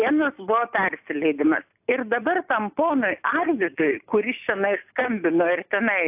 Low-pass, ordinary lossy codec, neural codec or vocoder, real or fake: 3.6 kHz; AAC, 32 kbps; none; real